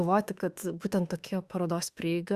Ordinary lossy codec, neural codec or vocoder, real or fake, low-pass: Opus, 64 kbps; autoencoder, 48 kHz, 32 numbers a frame, DAC-VAE, trained on Japanese speech; fake; 14.4 kHz